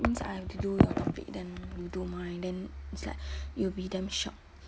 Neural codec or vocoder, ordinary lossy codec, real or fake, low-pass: none; none; real; none